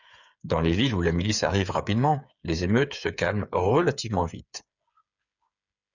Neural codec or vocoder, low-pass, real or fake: codec, 16 kHz, 8 kbps, FreqCodec, smaller model; 7.2 kHz; fake